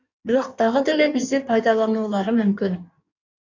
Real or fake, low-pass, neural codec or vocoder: fake; 7.2 kHz; codec, 16 kHz in and 24 kHz out, 1.1 kbps, FireRedTTS-2 codec